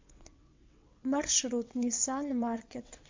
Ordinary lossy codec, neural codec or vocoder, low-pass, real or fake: MP3, 48 kbps; vocoder, 22.05 kHz, 80 mel bands, WaveNeXt; 7.2 kHz; fake